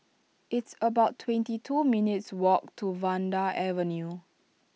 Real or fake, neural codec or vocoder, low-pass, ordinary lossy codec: real; none; none; none